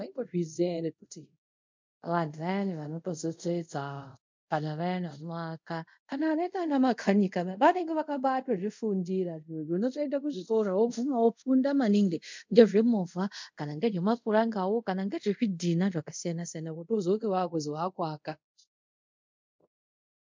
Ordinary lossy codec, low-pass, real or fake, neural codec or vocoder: MP3, 64 kbps; 7.2 kHz; fake; codec, 24 kHz, 0.5 kbps, DualCodec